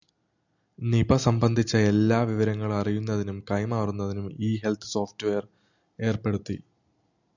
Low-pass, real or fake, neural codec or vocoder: 7.2 kHz; real; none